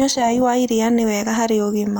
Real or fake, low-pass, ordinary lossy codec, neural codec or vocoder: real; none; none; none